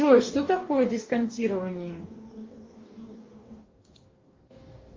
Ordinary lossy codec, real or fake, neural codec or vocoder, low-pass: Opus, 32 kbps; fake; codec, 44.1 kHz, 2.6 kbps, DAC; 7.2 kHz